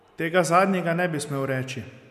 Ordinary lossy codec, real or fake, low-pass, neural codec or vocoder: none; real; 14.4 kHz; none